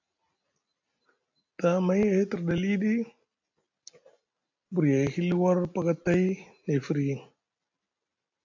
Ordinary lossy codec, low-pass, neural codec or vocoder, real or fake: AAC, 48 kbps; 7.2 kHz; none; real